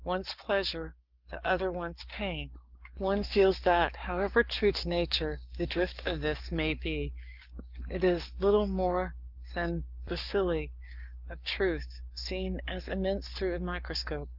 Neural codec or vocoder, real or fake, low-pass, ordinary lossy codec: codec, 44.1 kHz, 7.8 kbps, Pupu-Codec; fake; 5.4 kHz; Opus, 24 kbps